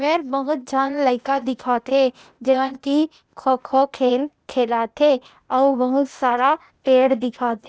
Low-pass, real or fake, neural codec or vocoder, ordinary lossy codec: none; fake; codec, 16 kHz, 0.8 kbps, ZipCodec; none